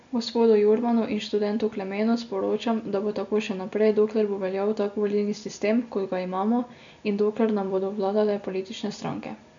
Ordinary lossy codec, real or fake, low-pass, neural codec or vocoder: AAC, 64 kbps; real; 7.2 kHz; none